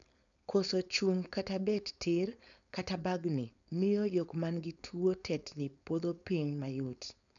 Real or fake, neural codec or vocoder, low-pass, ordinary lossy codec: fake; codec, 16 kHz, 4.8 kbps, FACodec; 7.2 kHz; none